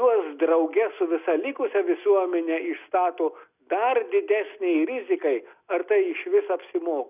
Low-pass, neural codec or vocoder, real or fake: 3.6 kHz; none; real